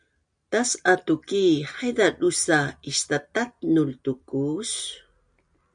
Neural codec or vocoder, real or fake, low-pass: none; real; 9.9 kHz